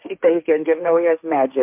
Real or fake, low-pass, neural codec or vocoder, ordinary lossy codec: fake; 3.6 kHz; codec, 16 kHz, 1.1 kbps, Voila-Tokenizer; MP3, 32 kbps